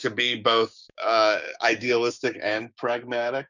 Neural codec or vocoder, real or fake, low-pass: codec, 16 kHz, 6 kbps, DAC; fake; 7.2 kHz